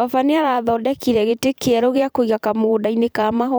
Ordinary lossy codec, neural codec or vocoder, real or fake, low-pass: none; vocoder, 44.1 kHz, 128 mel bands every 512 samples, BigVGAN v2; fake; none